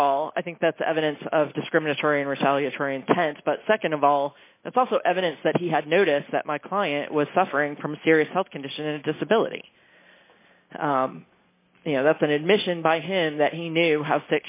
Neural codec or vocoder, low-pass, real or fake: none; 3.6 kHz; real